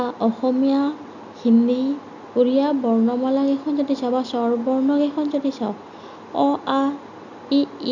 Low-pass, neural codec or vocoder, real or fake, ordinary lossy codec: 7.2 kHz; none; real; none